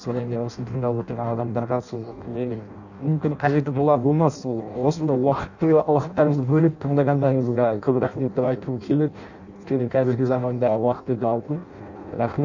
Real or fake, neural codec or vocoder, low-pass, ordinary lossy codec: fake; codec, 16 kHz in and 24 kHz out, 0.6 kbps, FireRedTTS-2 codec; 7.2 kHz; none